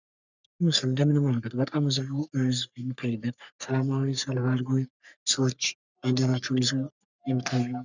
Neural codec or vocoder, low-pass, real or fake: codec, 44.1 kHz, 3.4 kbps, Pupu-Codec; 7.2 kHz; fake